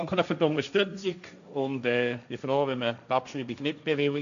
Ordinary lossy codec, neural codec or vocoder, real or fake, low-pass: none; codec, 16 kHz, 1.1 kbps, Voila-Tokenizer; fake; 7.2 kHz